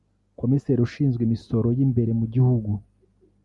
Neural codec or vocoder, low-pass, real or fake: none; 10.8 kHz; real